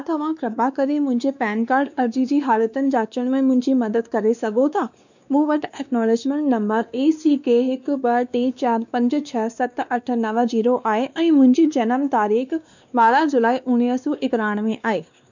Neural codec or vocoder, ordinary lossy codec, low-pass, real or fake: codec, 16 kHz, 2 kbps, X-Codec, WavLM features, trained on Multilingual LibriSpeech; none; 7.2 kHz; fake